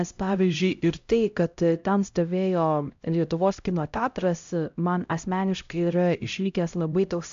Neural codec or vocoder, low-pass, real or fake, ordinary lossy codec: codec, 16 kHz, 0.5 kbps, X-Codec, HuBERT features, trained on LibriSpeech; 7.2 kHz; fake; AAC, 64 kbps